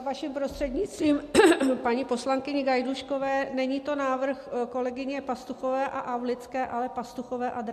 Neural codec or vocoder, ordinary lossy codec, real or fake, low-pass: none; MP3, 64 kbps; real; 14.4 kHz